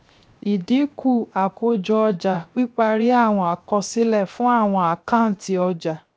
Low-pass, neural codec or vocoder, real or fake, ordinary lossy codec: none; codec, 16 kHz, 0.7 kbps, FocalCodec; fake; none